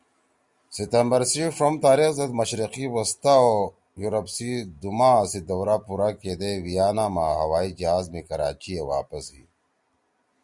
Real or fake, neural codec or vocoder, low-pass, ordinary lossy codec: real; none; 10.8 kHz; Opus, 64 kbps